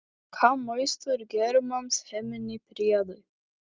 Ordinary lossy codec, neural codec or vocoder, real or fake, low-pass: Opus, 32 kbps; none; real; 7.2 kHz